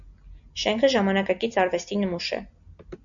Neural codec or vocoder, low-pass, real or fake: none; 7.2 kHz; real